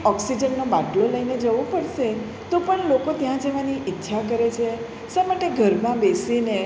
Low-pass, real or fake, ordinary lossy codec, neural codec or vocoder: none; real; none; none